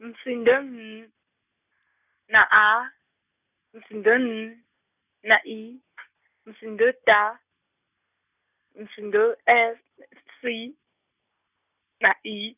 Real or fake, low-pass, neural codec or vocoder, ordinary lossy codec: real; 3.6 kHz; none; none